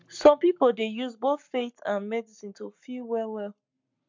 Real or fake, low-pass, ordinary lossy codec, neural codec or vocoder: fake; 7.2 kHz; MP3, 64 kbps; codec, 44.1 kHz, 7.8 kbps, Pupu-Codec